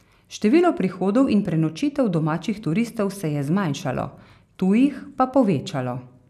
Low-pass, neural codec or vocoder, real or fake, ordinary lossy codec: 14.4 kHz; none; real; none